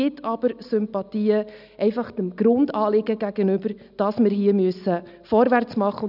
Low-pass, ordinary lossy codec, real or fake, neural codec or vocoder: 5.4 kHz; none; real; none